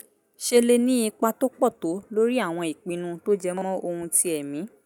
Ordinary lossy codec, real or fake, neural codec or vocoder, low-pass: none; real; none; none